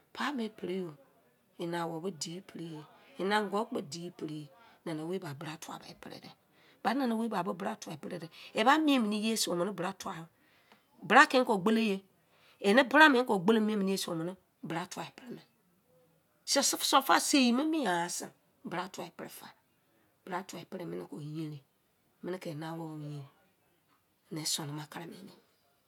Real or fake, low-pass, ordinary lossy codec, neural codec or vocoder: real; 19.8 kHz; none; none